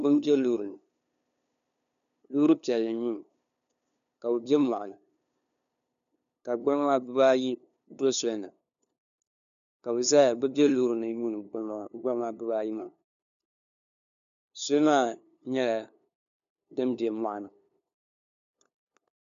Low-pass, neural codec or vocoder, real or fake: 7.2 kHz; codec, 16 kHz, 2 kbps, FunCodec, trained on LibriTTS, 25 frames a second; fake